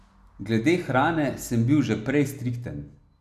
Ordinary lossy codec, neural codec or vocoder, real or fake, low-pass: none; none; real; 14.4 kHz